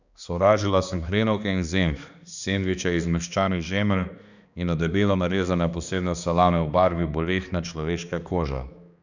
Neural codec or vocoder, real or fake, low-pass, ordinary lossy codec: codec, 16 kHz, 2 kbps, X-Codec, HuBERT features, trained on balanced general audio; fake; 7.2 kHz; none